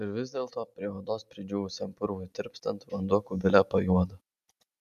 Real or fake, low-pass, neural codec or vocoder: real; 14.4 kHz; none